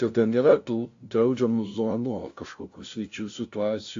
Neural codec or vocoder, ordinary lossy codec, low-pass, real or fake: codec, 16 kHz, 0.5 kbps, FunCodec, trained on LibriTTS, 25 frames a second; AAC, 48 kbps; 7.2 kHz; fake